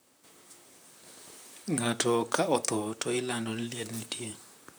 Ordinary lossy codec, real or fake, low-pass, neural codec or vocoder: none; fake; none; vocoder, 44.1 kHz, 128 mel bands, Pupu-Vocoder